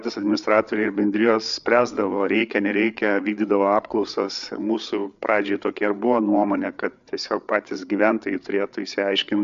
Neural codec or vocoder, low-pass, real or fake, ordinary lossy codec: codec, 16 kHz, 8 kbps, FreqCodec, larger model; 7.2 kHz; fake; MP3, 64 kbps